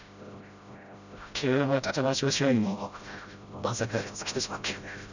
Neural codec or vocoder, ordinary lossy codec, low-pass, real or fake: codec, 16 kHz, 0.5 kbps, FreqCodec, smaller model; none; 7.2 kHz; fake